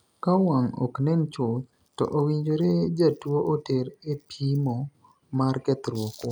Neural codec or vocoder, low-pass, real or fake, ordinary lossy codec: none; none; real; none